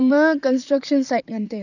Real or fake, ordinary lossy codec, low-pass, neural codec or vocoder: fake; none; 7.2 kHz; vocoder, 44.1 kHz, 128 mel bands, Pupu-Vocoder